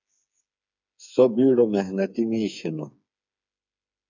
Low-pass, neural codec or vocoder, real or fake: 7.2 kHz; codec, 16 kHz, 4 kbps, FreqCodec, smaller model; fake